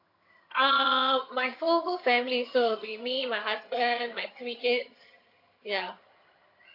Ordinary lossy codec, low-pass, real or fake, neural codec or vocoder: AAC, 32 kbps; 5.4 kHz; fake; vocoder, 22.05 kHz, 80 mel bands, HiFi-GAN